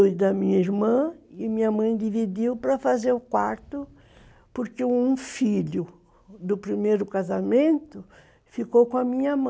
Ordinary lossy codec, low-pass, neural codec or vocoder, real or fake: none; none; none; real